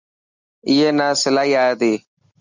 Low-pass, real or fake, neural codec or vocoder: 7.2 kHz; real; none